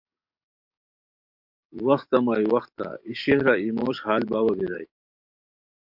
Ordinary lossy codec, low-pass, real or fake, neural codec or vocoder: MP3, 48 kbps; 5.4 kHz; fake; codec, 44.1 kHz, 7.8 kbps, DAC